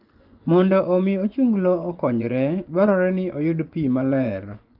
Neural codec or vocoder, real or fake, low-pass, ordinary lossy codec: vocoder, 24 kHz, 100 mel bands, Vocos; fake; 5.4 kHz; Opus, 32 kbps